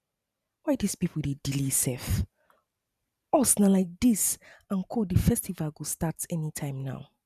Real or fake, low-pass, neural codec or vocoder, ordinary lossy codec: fake; 14.4 kHz; vocoder, 44.1 kHz, 128 mel bands every 512 samples, BigVGAN v2; none